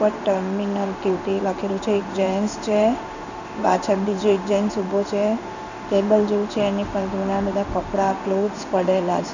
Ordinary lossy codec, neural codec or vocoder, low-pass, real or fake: none; codec, 16 kHz in and 24 kHz out, 1 kbps, XY-Tokenizer; 7.2 kHz; fake